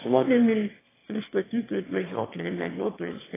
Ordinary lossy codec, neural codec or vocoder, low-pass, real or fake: AAC, 16 kbps; autoencoder, 22.05 kHz, a latent of 192 numbers a frame, VITS, trained on one speaker; 3.6 kHz; fake